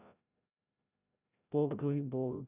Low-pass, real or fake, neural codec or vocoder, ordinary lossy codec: 3.6 kHz; fake; codec, 16 kHz, 0.5 kbps, FreqCodec, larger model; none